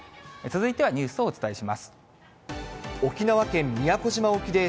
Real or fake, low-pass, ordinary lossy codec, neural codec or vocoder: real; none; none; none